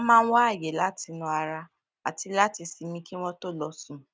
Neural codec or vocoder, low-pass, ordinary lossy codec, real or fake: none; none; none; real